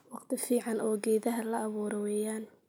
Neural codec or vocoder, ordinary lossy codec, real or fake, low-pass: none; none; real; none